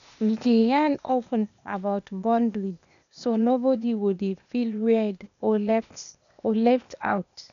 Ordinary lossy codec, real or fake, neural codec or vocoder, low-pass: none; fake; codec, 16 kHz, 0.8 kbps, ZipCodec; 7.2 kHz